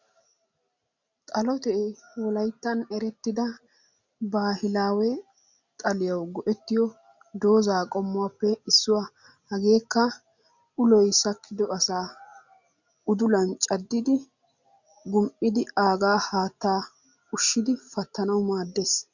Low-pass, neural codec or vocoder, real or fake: 7.2 kHz; none; real